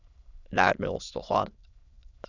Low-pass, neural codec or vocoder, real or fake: 7.2 kHz; autoencoder, 22.05 kHz, a latent of 192 numbers a frame, VITS, trained on many speakers; fake